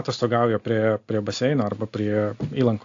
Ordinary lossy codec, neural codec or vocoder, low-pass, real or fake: AAC, 48 kbps; none; 7.2 kHz; real